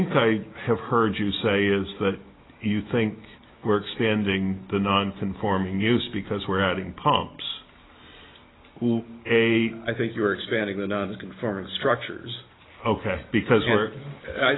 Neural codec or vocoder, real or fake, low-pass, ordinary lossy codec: none; real; 7.2 kHz; AAC, 16 kbps